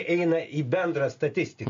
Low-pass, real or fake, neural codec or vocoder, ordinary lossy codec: 7.2 kHz; real; none; MP3, 48 kbps